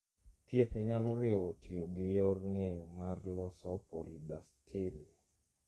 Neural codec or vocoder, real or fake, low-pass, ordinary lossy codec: codec, 32 kHz, 1.9 kbps, SNAC; fake; 14.4 kHz; Opus, 32 kbps